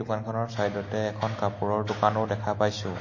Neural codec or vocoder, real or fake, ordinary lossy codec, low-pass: none; real; MP3, 32 kbps; 7.2 kHz